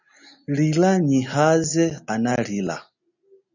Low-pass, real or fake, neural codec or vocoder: 7.2 kHz; real; none